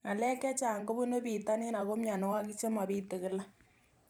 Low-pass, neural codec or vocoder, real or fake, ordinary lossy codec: none; vocoder, 44.1 kHz, 128 mel bands every 512 samples, BigVGAN v2; fake; none